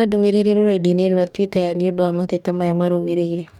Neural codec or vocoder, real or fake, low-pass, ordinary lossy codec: codec, 44.1 kHz, 2.6 kbps, DAC; fake; 19.8 kHz; none